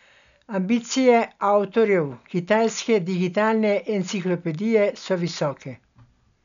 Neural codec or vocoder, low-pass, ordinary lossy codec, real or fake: none; 7.2 kHz; none; real